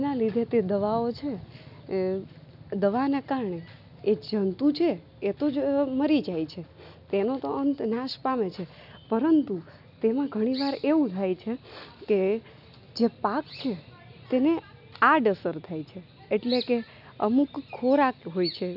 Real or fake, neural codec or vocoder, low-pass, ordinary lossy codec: real; none; 5.4 kHz; none